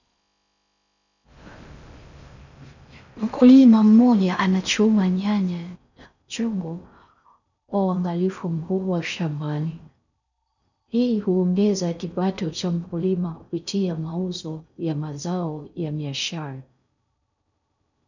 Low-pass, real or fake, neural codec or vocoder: 7.2 kHz; fake; codec, 16 kHz in and 24 kHz out, 0.6 kbps, FocalCodec, streaming, 4096 codes